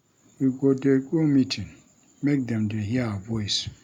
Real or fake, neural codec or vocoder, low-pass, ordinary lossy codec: real; none; 19.8 kHz; none